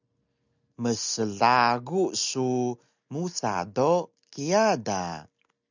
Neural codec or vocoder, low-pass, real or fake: none; 7.2 kHz; real